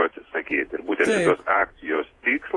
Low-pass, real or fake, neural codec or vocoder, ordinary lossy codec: 10.8 kHz; fake; vocoder, 48 kHz, 128 mel bands, Vocos; AAC, 32 kbps